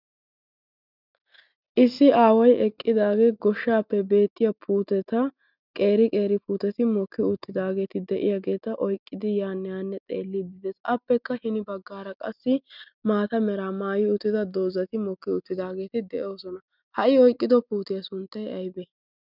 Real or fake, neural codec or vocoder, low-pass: real; none; 5.4 kHz